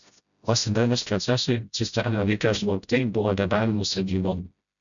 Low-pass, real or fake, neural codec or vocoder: 7.2 kHz; fake; codec, 16 kHz, 0.5 kbps, FreqCodec, smaller model